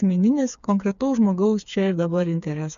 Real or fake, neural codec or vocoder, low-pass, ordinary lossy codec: fake; codec, 16 kHz, 4 kbps, FreqCodec, smaller model; 7.2 kHz; MP3, 64 kbps